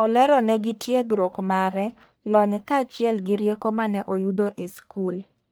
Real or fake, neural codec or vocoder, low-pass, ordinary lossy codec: fake; codec, 44.1 kHz, 1.7 kbps, Pupu-Codec; none; none